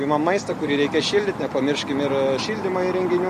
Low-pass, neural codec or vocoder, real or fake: 14.4 kHz; none; real